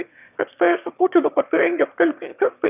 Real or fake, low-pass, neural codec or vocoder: fake; 3.6 kHz; autoencoder, 22.05 kHz, a latent of 192 numbers a frame, VITS, trained on one speaker